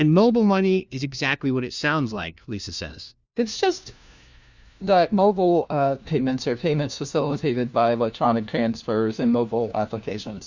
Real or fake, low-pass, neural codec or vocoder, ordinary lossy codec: fake; 7.2 kHz; codec, 16 kHz, 1 kbps, FunCodec, trained on LibriTTS, 50 frames a second; Opus, 64 kbps